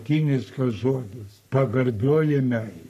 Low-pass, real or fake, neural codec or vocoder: 14.4 kHz; fake; codec, 44.1 kHz, 3.4 kbps, Pupu-Codec